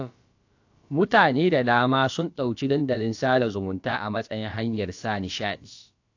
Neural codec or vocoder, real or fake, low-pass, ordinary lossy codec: codec, 16 kHz, about 1 kbps, DyCAST, with the encoder's durations; fake; 7.2 kHz; AAC, 48 kbps